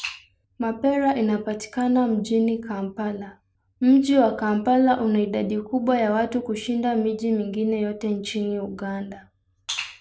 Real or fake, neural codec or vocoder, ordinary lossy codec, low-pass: real; none; none; none